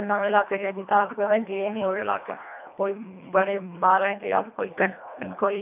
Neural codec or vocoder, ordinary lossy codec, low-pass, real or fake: codec, 24 kHz, 1.5 kbps, HILCodec; AAC, 32 kbps; 3.6 kHz; fake